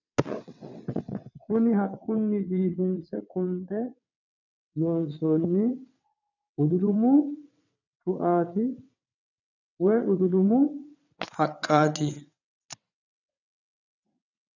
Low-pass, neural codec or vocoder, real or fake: 7.2 kHz; vocoder, 22.05 kHz, 80 mel bands, WaveNeXt; fake